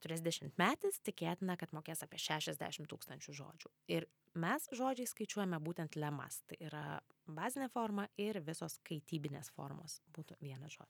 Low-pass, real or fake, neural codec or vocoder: 19.8 kHz; real; none